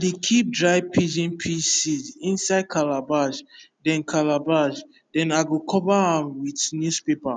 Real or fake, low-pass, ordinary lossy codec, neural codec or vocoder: real; 9.9 kHz; none; none